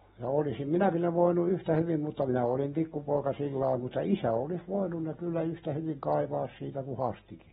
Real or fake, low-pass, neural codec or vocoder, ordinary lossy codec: real; 19.8 kHz; none; AAC, 16 kbps